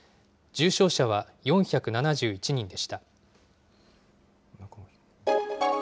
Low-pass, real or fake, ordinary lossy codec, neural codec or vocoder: none; real; none; none